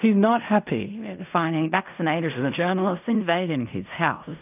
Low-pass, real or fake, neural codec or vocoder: 3.6 kHz; fake; codec, 16 kHz in and 24 kHz out, 0.4 kbps, LongCat-Audio-Codec, fine tuned four codebook decoder